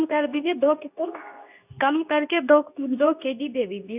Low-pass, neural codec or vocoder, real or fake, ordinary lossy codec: 3.6 kHz; codec, 24 kHz, 0.9 kbps, WavTokenizer, medium speech release version 2; fake; none